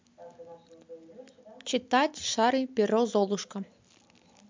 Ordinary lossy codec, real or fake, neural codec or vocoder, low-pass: MP3, 64 kbps; real; none; 7.2 kHz